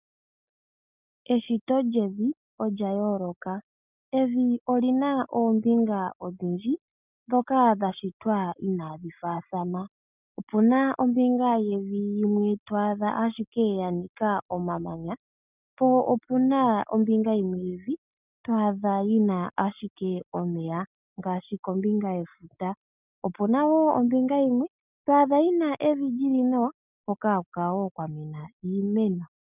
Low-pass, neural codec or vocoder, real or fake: 3.6 kHz; none; real